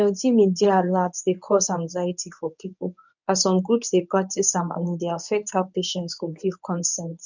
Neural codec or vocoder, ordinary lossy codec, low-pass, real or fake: codec, 24 kHz, 0.9 kbps, WavTokenizer, medium speech release version 1; none; 7.2 kHz; fake